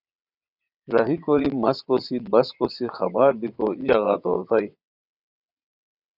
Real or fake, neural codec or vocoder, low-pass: fake; vocoder, 22.05 kHz, 80 mel bands, WaveNeXt; 5.4 kHz